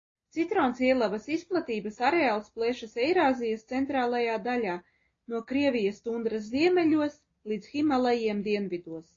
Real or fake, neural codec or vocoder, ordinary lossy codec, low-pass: real; none; AAC, 32 kbps; 7.2 kHz